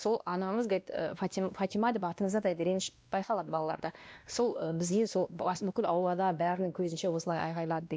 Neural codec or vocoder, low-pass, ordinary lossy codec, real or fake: codec, 16 kHz, 1 kbps, X-Codec, WavLM features, trained on Multilingual LibriSpeech; none; none; fake